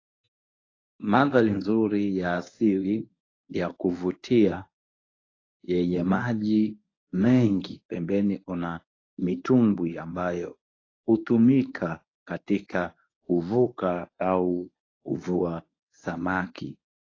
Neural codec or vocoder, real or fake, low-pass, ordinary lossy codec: codec, 24 kHz, 0.9 kbps, WavTokenizer, medium speech release version 1; fake; 7.2 kHz; AAC, 32 kbps